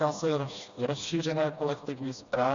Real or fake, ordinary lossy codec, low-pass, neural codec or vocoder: fake; Opus, 64 kbps; 7.2 kHz; codec, 16 kHz, 1 kbps, FreqCodec, smaller model